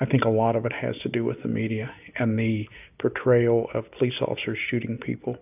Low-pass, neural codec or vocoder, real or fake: 3.6 kHz; none; real